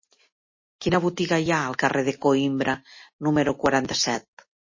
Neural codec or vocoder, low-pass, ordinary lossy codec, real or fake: none; 7.2 kHz; MP3, 32 kbps; real